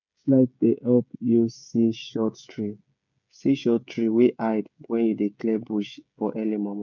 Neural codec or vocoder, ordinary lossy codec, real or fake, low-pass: codec, 16 kHz, 16 kbps, FreqCodec, smaller model; none; fake; 7.2 kHz